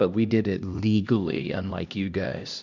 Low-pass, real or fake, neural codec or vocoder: 7.2 kHz; fake; codec, 16 kHz, 1 kbps, X-Codec, HuBERT features, trained on LibriSpeech